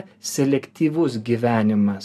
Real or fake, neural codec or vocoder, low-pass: real; none; 14.4 kHz